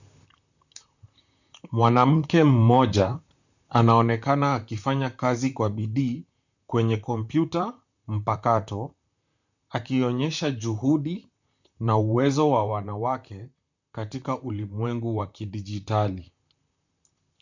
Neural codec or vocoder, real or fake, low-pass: vocoder, 44.1 kHz, 128 mel bands, Pupu-Vocoder; fake; 7.2 kHz